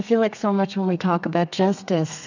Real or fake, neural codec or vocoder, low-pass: fake; codec, 32 kHz, 1.9 kbps, SNAC; 7.2 kHz